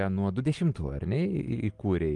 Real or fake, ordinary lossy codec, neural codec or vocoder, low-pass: real; Opus, 32 kbps; none; 10.8 kHz